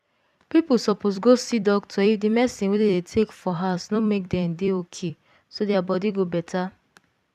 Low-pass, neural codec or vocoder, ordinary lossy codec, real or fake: 14.4 kHz; vocoder, 44.1 kHz, 128 mel bands every 256 samples, BigVGAN v2; none; fake